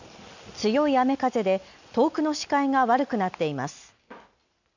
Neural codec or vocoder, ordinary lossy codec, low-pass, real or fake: none; none; 7.2 kHz; real